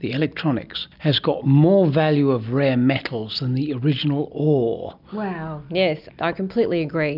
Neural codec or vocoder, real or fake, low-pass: none; real; 5.4 kHz